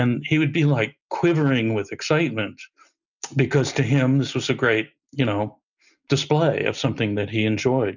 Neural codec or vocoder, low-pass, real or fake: none; 7.2 kHz; real